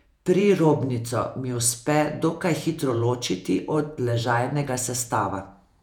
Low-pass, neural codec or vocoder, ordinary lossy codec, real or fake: 19.8 kHz; vocoder, 48 kHz, 128 mel bands, Vocos; none; fake